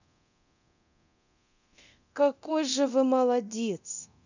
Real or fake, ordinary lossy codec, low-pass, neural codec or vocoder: fake; none; 7.2 kHz; codec, 24 kHz, 0.9 kbps, DualCodec